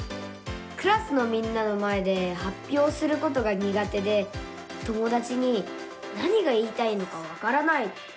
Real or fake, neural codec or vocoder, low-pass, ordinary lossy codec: real; none; none; none